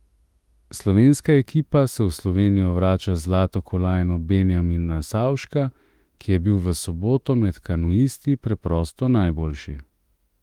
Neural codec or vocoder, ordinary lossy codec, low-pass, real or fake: autoencoder, 48 kHz, 32 numbers a frame, DAC-VAE, trained on Japanese speech; Opus, 24 kbps; 19.8 kHz; fake